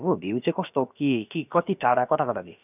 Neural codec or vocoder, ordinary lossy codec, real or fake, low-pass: codec, 16 kHz, about 1 kbps, DyCAST, with the encoder's durations; none; fake; 3.6 kHz